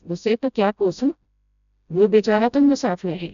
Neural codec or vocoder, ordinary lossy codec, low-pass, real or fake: codec, 16 kHz, 0.5 kbps, FreqCodec, smaller model; none; 7.2 kHz; fake